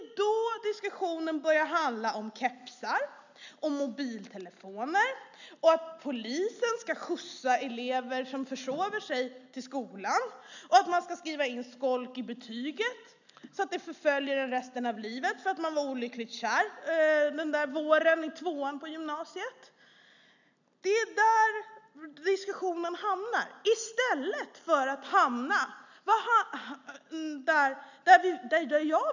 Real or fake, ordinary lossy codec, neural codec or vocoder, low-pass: real; none; none; 7.2 kHz